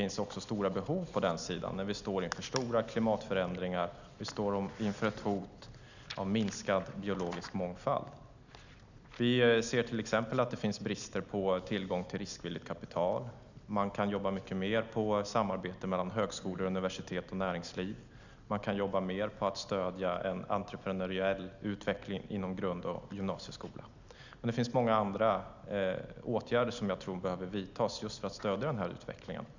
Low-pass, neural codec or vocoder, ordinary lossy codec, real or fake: 7.2 kHz; none; none; real